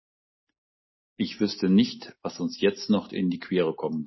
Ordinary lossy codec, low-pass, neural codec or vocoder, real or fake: MP3, 24 kbps; 7.2 kHz; none; real